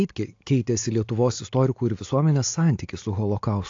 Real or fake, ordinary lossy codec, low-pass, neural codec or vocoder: real; AAC, 48 kbps; 7.2 kHz; none